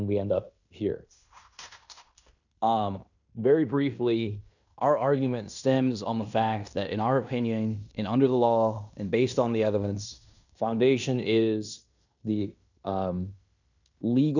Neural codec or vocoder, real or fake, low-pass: codec, 16 kHz in and 24 kHz out, 0.9 kbps, LongCat-Audio-Codec, fine tuned four codebook decoder; fake; 7.2 kHz